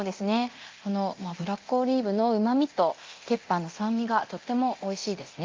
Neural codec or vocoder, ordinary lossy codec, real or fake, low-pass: codec, 24 kHz, 0.9 kbps, DualCodec; Opus, 24 kbps; fake; 7.2 kHz